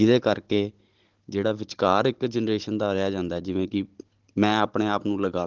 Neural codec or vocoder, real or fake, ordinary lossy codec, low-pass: codec, 16 kHz, 16 kbps, FunCodec, trained on Chinese and English, 50 frames a second; fake; Opus, 16 kbps; 7.2 kHz